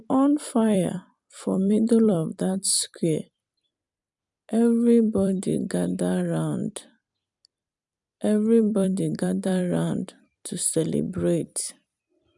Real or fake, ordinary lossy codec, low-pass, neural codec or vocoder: fake; none; 10.8 kHz; vocoder, 44.1 kHz, 128 mel bands every 256 samples, BigVGAN v2